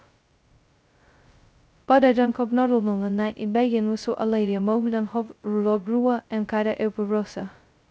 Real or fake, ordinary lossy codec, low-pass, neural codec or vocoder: fake; none; none; codec, 16 kHz, 0.2 kbps, FocalCodec